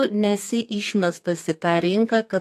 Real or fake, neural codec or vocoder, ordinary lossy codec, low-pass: fake; codec, 32 kHz, 1.9 kbps, SNAC; AAC, 64 kbps; 14.4 kHz